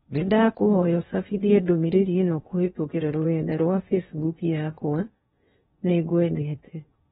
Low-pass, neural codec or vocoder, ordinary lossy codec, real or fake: 10.8 kHz; codec, 16 kHz in and 24 kHz out, 0.8 kbps, FocalCodec, streaming, 65536 codes; AAC, 16 kbps; fake